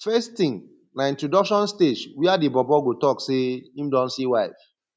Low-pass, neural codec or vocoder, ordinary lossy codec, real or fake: none; none; none; real